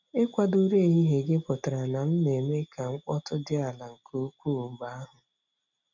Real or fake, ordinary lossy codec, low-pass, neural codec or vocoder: real; none; 7.2 kHz; none